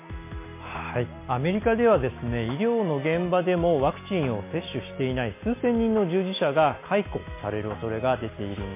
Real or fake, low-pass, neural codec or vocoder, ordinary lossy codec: real; 3.6 kHz; none; MP3, 24 kbps